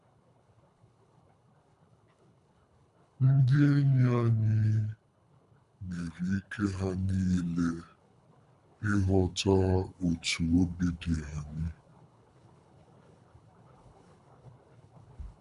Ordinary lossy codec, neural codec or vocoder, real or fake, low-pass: none; codec, 24 kHz, 3 kbps, HILCodec; fake; 10.8 kHz